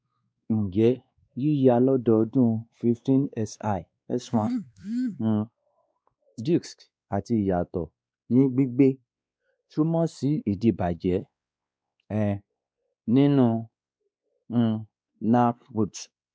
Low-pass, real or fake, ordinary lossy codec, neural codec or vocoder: none; fake; none; codec, 16 kHz, 2 kbps, X-Codec, WavLM features, trained on Multilingual LibriSpeech